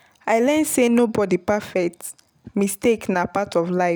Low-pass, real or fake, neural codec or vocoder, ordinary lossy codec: none; real; none; none